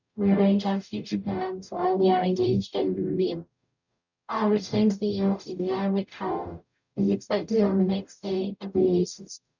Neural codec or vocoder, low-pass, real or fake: codec, 44.1 kHz, 0.9 kbps, DAC; 7.2 kHz; fake